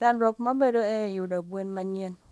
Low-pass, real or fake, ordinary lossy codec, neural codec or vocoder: none; fake; none; codec, 24 kHz, 0.9 kbps, WavTokenizer, small release